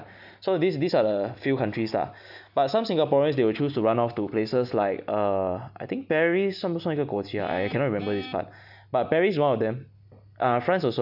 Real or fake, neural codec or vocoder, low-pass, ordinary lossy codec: real; none; 5.4 kHz; none